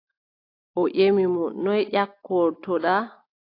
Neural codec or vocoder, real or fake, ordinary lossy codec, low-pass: none; real; AAC, 32 kbps; 5.4 kHz